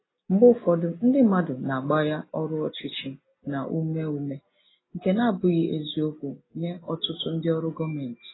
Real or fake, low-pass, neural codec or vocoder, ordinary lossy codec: real; 7.2 kHz; none; AAC, 16 kbps